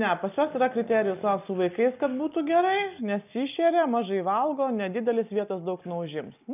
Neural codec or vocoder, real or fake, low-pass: none; real; 3.6 kHz